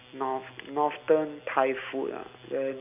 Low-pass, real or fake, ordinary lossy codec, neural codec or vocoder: 3.6 kHz; real; none; none